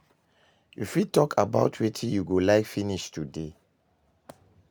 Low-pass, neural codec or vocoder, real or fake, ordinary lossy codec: none; none; real; none